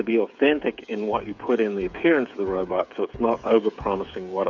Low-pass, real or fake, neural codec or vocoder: 7.2 kHz; fake; codec, 16 kHz, 8 kbps, FreqCodec, smaller model